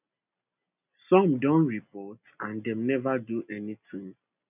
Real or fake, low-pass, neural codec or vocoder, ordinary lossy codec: real; 3.6 kHz; none; none